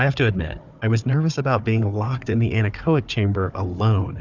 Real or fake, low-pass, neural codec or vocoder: fake; 7.2 kHz; codec, 16 kHz, 4 kbps, FunCodec, trained on Chinese and English, 50 frames a second